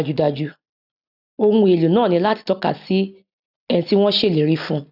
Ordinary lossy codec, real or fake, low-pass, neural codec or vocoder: none; real; 5.4 kHz; none